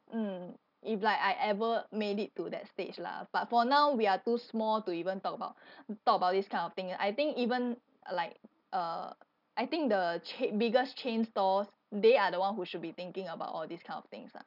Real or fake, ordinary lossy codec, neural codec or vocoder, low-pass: real; none; none; 5.4 kHz